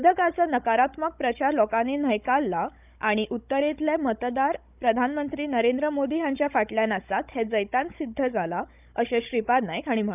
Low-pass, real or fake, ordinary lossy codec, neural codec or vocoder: 3.6 kHz; fake; none; codec, 16 kHz, 16 kbps, FunCodec, trained on Chinese and English, 50 frames a second